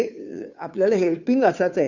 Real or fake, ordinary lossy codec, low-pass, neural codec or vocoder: fake; none; 7.2 kHz; codec, 16 kHz, 2 kbps, FunCodec, trained on Chinese and English, 25 frames a second